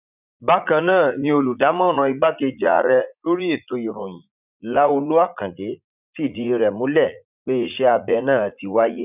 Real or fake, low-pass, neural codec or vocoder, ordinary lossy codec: fake; 3.6 kHz; vocoder, 44.1 kHz, 80 mel bands, Vocos; none